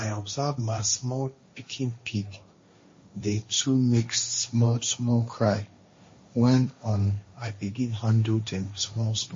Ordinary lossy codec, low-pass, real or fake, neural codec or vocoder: MP3, 32 kbps; 7.2 kHz; fake; codec, 16 kHz, 1.1 kbps, Voila-Tokenizer